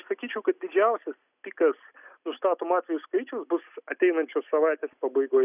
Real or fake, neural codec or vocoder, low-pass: real; none; 3.6 kHz